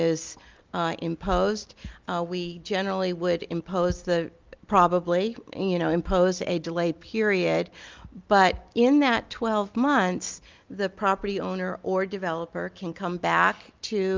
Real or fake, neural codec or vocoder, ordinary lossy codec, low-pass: real; none; Opus, 24 kbps; 7.2 kHz